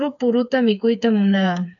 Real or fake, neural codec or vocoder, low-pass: fake; codec, 16 kHz, 4 kbps, FreqCodec, smaller model; 7.2 kHz